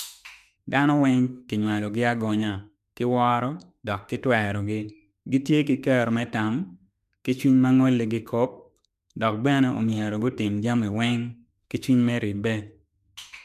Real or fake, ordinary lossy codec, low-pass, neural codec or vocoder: fake; none; 14.4 kHz; autoencoder, 48 kHz, 32 numbers a frame, DAC-VAE, trained on Japanese speech